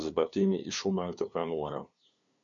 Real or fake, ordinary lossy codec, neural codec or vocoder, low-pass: fake; MP3, 64 kbps; codec, 16 kHz, 2 kbps, FunCodec, trained on LibriTTS, 25 frames a second; 7.2 kHz